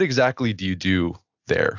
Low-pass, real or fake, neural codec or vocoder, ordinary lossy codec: 7.2 kHz; real; none; AAC, 48 kbps